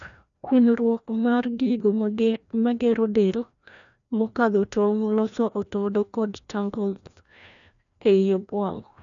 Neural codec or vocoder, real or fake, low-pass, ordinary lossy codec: codec, 16 kHz, 1 kbps, FreqCodec, larger model; fake; 7.2 kHz; none